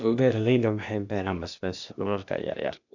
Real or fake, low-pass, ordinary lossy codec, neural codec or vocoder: fake; 7.2 kHz; none; codec, 16 kHz, 0.8 kbps, ZipCodec